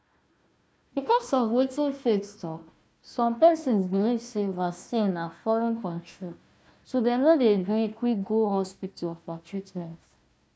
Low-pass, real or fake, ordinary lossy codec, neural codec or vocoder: none; fake; none; codec, 16 kHz, 1 kbps, FunCodec, trained on Chinese and English, 50 frames a second